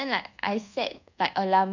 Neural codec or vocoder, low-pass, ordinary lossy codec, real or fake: codec, 24 kHz, 1.2 kbps, DualCodec; 7.2 kHz; none; fake